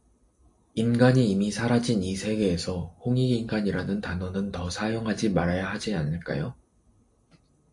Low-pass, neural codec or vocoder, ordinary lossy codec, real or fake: 10.8 kHz; none; AAC, 64 kbps; real